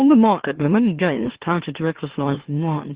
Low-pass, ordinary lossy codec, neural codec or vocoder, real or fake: 3.6 kHz; Opus, 16 kbps; autoencoder, 44.1 kHz, a latent of 192 numbers a frame, MeloTTS; fake